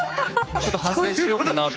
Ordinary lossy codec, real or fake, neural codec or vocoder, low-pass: none; fake; codec, 16 kHz, 4 kbps, X-Codec, HuBERT features, trained on general audio; none